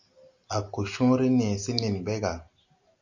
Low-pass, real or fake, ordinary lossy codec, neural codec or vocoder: 7.2 kHz; real; MP3, 64 kbps; none